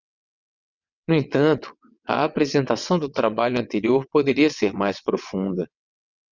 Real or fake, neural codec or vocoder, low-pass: fake; vocoder, 22.05 kHz, 80 mel bands, WaveNeXt; 7.2 kHz